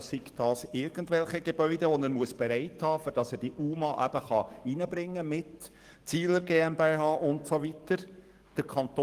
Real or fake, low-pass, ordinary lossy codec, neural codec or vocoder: fake; 14.4 kHz; Opus, 24 kbps; codec, 44.1 kHz, 7.8 kbps, DAC